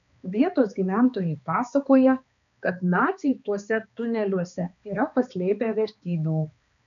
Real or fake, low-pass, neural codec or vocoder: fake; 7.2 kHz; codec, 16 kHz, 2 kbps, X-Codec, HuBERT features, trained on balanced general audio